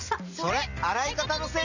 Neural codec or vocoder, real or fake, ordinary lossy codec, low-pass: none; real; none; 7.2 kHz